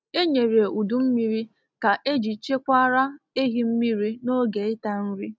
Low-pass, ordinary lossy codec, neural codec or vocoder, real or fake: none; none; none; real